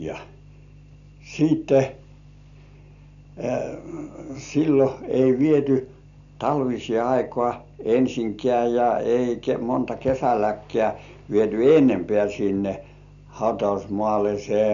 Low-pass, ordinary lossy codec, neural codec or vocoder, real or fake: 7.2 kHz; none; none; real